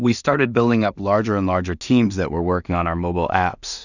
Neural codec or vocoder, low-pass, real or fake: codec, 16 kHz in and 24 kHz out, 0.4 kbps, LongCat-Audio-Codec, two codebook decoder; 7.2 kHz; fake